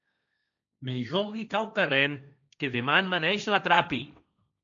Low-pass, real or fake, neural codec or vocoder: 7.2 kHz; fake; codec, 16 kHz, 1.1 kbps, Voila-Tokenizer